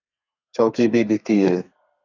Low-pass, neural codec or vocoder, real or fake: 7.2 kHz; codec, 32 kHz, 1.9 kbps, SNAC; fake